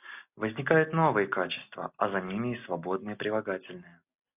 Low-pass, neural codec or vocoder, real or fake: 3.6 kHz; none; real